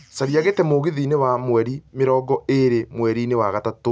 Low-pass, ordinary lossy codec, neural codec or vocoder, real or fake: none; none; none; real